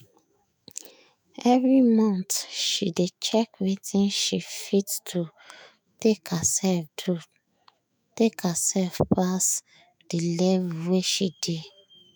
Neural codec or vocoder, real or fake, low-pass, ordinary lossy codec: autoencoder, 48 kHz, 128 numbers a frame, DAC-VAE, trained on Japanese speech; fake; none; none